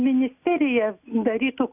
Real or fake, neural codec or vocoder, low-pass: real; none; 3.6 kHz